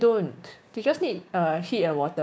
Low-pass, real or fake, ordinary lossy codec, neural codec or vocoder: none; fake; none; codec, 16 kHz, 6 kbps, DAC